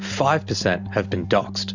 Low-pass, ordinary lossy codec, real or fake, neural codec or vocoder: 7.2 kHz; Opus, 64 kbps; real; none